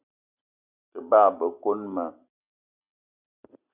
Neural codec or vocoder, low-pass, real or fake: none; 3.6 kHz; real